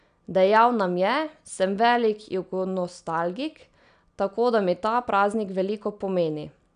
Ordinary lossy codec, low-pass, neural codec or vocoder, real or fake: none; 9.9 kHz; none; real